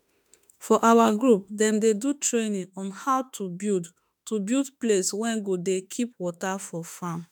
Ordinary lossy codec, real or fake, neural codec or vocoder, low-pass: none; fake; autoencoder, 48 kHz, 32 numbers a frame, DAC-VAE, trained on Japanese speech; none